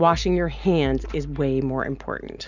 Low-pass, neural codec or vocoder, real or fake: 7.2 kHz; none; real